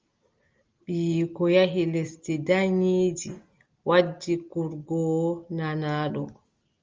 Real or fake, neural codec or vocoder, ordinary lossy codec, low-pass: real; none; Opus, 32 kbps; 7.2 kHz